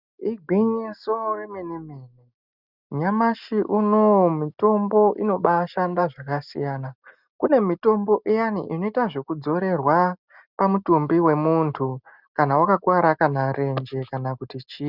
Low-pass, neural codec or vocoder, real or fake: 5.4 kHz; none; real